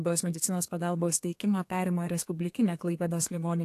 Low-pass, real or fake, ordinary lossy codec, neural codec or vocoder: 14.4 kHz; fake; AAC, 64 kbps; codec, 32 kHz, 1.9 kbps, SNAC